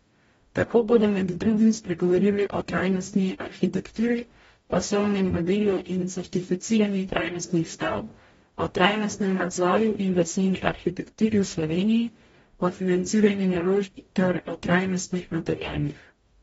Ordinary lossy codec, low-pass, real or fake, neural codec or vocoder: AAC, 24 kbps; 19.8 kHz; fake; codec, 44.1 kHz, 0.9 kbps, DAC